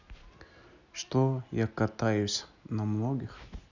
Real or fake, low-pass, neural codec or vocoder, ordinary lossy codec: real; 7.2 kHz; none; none